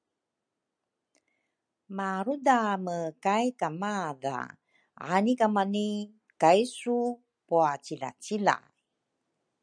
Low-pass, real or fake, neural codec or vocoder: 9.9 kHz; real; none